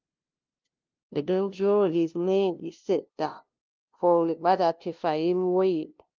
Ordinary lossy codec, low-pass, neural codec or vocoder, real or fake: Opus, 32 kbps; 7.2 kHz; codec, 16 kHz, 0.5 kbps, FunCodec, trained on LibriTTS, 25 frames a second; fake